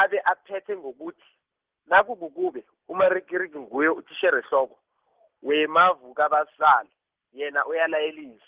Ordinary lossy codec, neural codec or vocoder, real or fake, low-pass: Opus, 16 kbps; none; real; 3.6 kHz